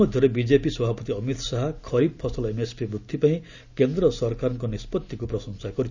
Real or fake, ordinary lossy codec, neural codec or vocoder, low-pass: real; none; none; 7.2 kHz